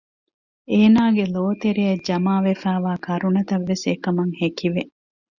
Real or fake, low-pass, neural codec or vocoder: real; 7.2 kHz; none